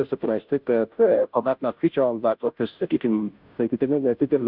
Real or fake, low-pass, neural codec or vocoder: fake; 5.4 kHz; codec, 16 kHz, 0.5 kbps, FunCodec, trained on Chinese and English, 25 frames a second